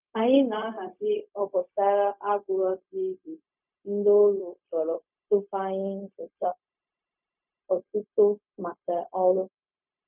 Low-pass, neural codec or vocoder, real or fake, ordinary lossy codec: 3.6 kHz; codec, 16 kHz, 0.4 kbps, LongCat-Audio-Codec; fake; none